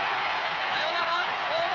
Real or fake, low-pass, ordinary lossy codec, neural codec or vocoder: fake; 7.2 kHz; none; codec, 16 kHz, 8 kbps, FreqCodec, smaller model